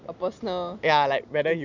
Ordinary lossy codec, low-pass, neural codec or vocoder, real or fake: none; 7.2 kHz; none; real